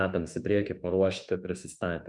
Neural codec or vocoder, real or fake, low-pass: autoencoder, 48 kHz, 32 numbers a frame, DAC-VAE, trained on Japanese speech; fake; 10.8 kHz